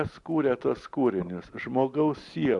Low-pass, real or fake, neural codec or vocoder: 10.8 kHz; real; none